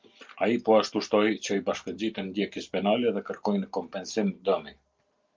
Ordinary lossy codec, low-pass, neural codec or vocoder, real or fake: Opus, 24 kbps; 7.2 kHz; none; real